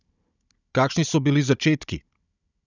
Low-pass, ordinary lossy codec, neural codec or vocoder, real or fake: 7.2 kHz; none; codec, 16 kHz, 16 kbps, FunCodec, trained on Chinese and English, 50 frames a second; fake